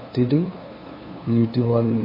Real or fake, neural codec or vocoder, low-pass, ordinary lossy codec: fake; codec, 16 kHz, 2 kbps, FunCodec, trained on LibriTTS, 25 frames a second; 5.4 kHz; MP3, 24 kbps